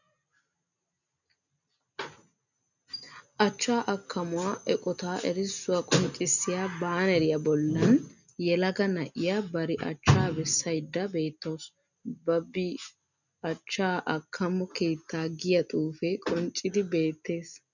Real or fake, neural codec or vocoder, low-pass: real; none; 7.2 kHz